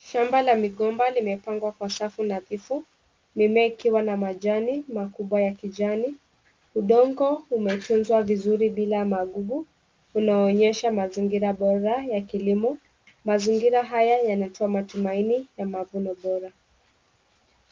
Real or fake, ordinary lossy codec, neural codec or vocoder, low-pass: real; Opus, 24 kbps; none; 7.2 kHz